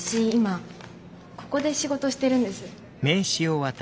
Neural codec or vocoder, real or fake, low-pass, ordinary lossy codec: none; real; none; none